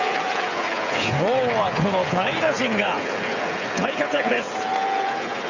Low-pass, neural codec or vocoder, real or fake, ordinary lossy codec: 7.2 kHz; codec, 16 kHz, 8 kbps, FreqCodec, smaller model; fake; none